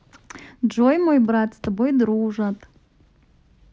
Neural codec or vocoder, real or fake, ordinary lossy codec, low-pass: none; real; none; none